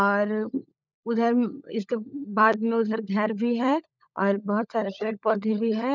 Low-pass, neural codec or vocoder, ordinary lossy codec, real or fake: 7.2 kHz; codec, 16 kHz, 16 kbps, FunCodec, trained on LibriTTS, 50 frames a second; none; fake